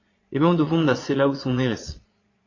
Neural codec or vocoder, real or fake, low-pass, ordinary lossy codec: vocoder, 22.05 kHz, 80 mel bands, Vocos; fake; 7.2 kHz; AAC, 32 kbps